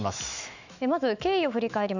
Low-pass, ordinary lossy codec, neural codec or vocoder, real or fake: 7.2 kHz; none; autoencoder, 48 kHz, 128 numbers a frame, DAC-VAE, trained on Japanese speech; fake